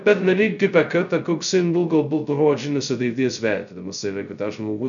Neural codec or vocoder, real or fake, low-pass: codec, 16 kHz, 0.2 kbps, FocalCodec; fake; 7.2 kHz